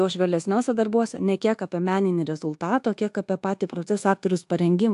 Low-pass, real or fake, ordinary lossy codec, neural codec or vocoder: 10.8 kHz; fake; AAC, 64 kbps; codec, 24 kHz, 1.2 kbps, DualCodec